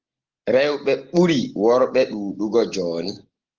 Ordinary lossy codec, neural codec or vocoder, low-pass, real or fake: Opus, 16 kbps; none; 7.2 kHz; real